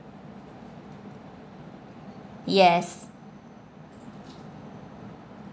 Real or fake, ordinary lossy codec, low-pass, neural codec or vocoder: real; none; none; none